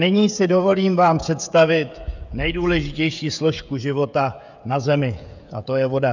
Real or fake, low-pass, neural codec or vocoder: fake; 7.2 kHz; codec, 16 kHz, 16 kbps, FreqCodec, smaller model